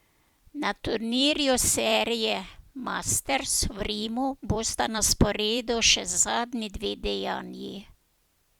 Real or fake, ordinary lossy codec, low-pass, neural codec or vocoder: fake; Opus, 64 kbps; 19.8 kHz; vocoder, 44.1 kHz, 128 mel bands every 256 samples, BigVGAN v2